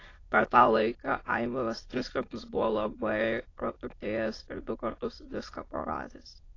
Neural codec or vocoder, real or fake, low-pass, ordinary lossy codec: autoencoder, 22.05 kHz, a latent of 192 numbers a frame, VITS, trained on many speakers; fake; 7.2 kHz; AAC, 32 kbps